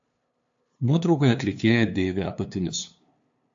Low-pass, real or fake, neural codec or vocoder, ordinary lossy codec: 7.2 kHz; fake; codec, 16 kHz, 2 kbps, FunCodec, trained on LibriTTS, 25 frames a second; AAC, 64 kbps